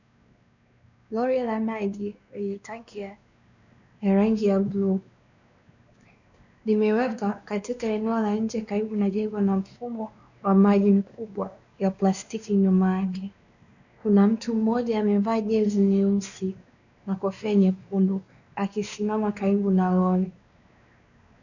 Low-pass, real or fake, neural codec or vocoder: 7.2 kHz; fake; codec, 16 kHz, 2 kbps, X-Codec, WavLM features, trained on Multilingual LibriSpeech